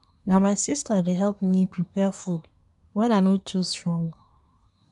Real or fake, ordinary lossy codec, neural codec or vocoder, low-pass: fake; none; codec, 24 kHz, 1 kbps, SNAC; 10.8 kHz